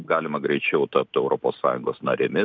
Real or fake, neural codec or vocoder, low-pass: real; none; 7.2 kHz